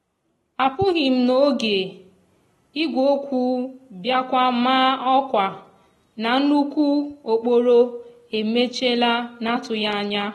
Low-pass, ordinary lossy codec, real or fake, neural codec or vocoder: 19.8 kHz; AAC, 32 kbps; real; none